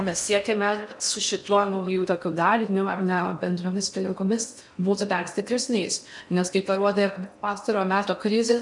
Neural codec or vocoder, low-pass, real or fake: codec, 16 kHz in and 24 kHz out, 0.6 kbps, FocalCodec, streaming, 4096 codes; 10.8 kHz; fake